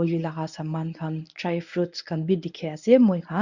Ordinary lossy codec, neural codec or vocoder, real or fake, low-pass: none; codec, 24 kHz, 0.9 kbps, WavTokenizer, medium speech release version 1; fake; 7.2 kHz